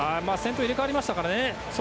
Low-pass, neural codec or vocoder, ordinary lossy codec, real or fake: none; none; none; real